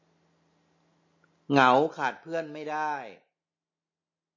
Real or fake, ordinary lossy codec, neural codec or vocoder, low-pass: real; MP3, 32 kbps; none; 7.2 kHz